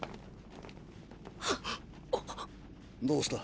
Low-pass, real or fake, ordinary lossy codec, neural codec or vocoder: none; real; none; none